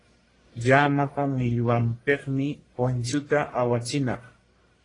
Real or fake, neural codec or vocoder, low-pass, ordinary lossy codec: fake; codec, 44.1 kHz, 1.7 kbps, Pupu-Codec; 10.8 kHz; AAC, 32 kbps